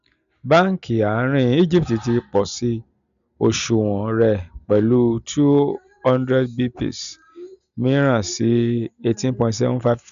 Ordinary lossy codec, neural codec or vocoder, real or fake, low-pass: none; none; real; 7.2 kHz